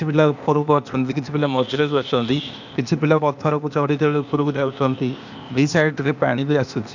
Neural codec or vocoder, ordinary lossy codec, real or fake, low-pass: codec, 16 kHz, 0.8 kbps, ZipCodec; none; fake; 7.2 kHz